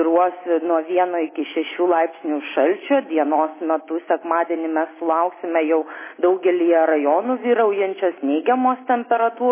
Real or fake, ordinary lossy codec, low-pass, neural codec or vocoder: real; MP3, 16 kbps; 3.6 kHz; none